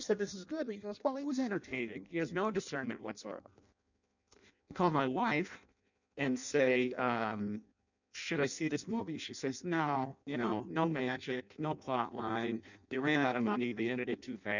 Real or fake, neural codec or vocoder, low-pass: fake; codec, 16 kHz in and 24 kHz out, 0.6 kbps, FireRedTTS-2 codec; 7.2 kHz